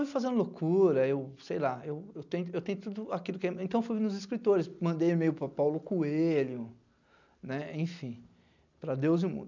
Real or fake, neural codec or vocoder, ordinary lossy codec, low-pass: real; none; none; 7.2 kHz